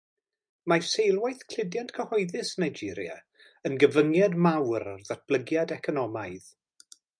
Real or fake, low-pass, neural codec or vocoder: real; 9.9 kHz; none